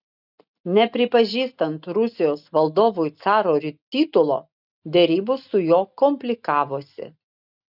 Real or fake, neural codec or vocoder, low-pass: real; none; 5.4 kHz